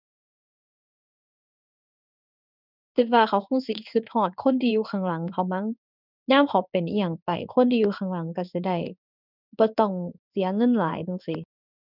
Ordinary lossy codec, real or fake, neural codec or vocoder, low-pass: none; fake; codec, 16 kHz in and 24 kHz out, 1 kbps, XY-Tokenizer; 5.4 kHz